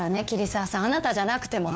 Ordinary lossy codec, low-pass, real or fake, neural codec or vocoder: none; none; fake; codec, 16 kHz, 4 kbps, FunCodec, trained on LibriTTS, 50 frames a second